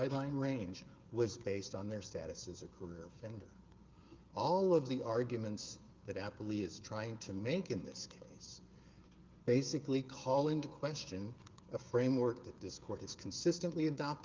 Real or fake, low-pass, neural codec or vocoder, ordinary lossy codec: fake; 7.2 kHz; codec, 16 kHz, 8 kbps, FreqCodec, smaller model; Opus, 32 kbps